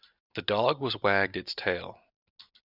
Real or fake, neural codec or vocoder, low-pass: real; none; 5.4 kHz